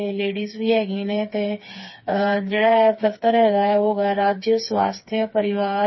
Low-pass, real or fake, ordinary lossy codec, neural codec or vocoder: 7.2 kHz; fake; MP3, 24 kbps; codec, 16 kHz, 4 kbps, FreqCodec, smaller model